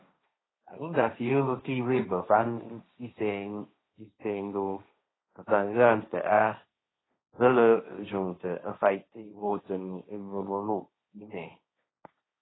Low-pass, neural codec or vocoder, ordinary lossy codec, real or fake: 7.2 kHz; codec, 16 kHz, 1.1 kbps, Voila-Tokenizer; AAC, 16 kbps; fake